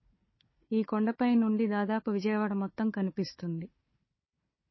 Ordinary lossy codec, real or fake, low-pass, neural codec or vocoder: MP3, 24 kbps; fake; 7.2 kHz; codec, 16 kHz, 4 kbps, FunCodec, trained on Chinese and English, 50 frames a second